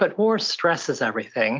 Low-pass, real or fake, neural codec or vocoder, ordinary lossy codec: 7.2 kHz; real; none; Opus, 32 kbps